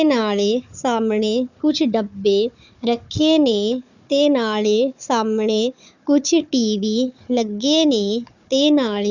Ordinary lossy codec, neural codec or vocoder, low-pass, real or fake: none; codec, 16 kHz, 4 kbps, FunCodec, trained on Chinese and English, 50 frames a second; 7.2 kHz; fake